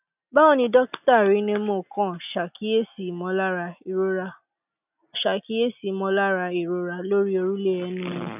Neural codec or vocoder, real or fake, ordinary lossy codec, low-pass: none; real; none; 3.6 kHz